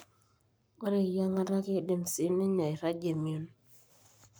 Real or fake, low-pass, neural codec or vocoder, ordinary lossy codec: fake; none; codec, 44.1 kHz, 7.8 kbps, Pupu-Codec; none